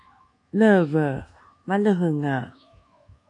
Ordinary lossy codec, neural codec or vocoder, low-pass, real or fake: AAC, 48 kbps; codec, 24 kHz, 1.2 kbps, DualCodec; 10.8 kHz; fake